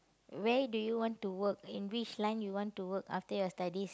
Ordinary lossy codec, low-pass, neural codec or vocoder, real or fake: none; none; none; real